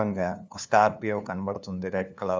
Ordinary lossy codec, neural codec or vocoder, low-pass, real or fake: none; codec, 16 kHz, 2 kbps, FunCodec, trained on LibriTTS, 25 frames a second; none; fake